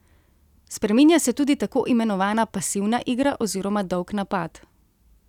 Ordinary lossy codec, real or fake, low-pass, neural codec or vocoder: none; real; 19.8 kHz; none